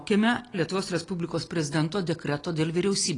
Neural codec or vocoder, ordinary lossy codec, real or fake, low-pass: none; AAC, 32 kbps; real; 10.8 kHz